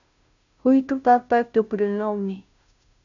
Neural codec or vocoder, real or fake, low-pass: codec, 16 kHz, 0.5 kbps, FunCodec, trained on Chinese and English, 25 frames a second; fake; 7.2 kHz